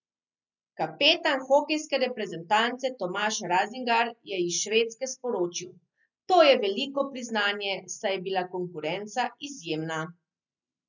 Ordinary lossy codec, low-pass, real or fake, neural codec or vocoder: none; 7.2 kHz; real; none